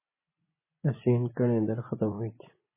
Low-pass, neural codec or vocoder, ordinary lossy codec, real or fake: 3.6 kHz; vocoder, 24 kHz, 100 mel bands, Vocos; MP3, 16 kbps; fake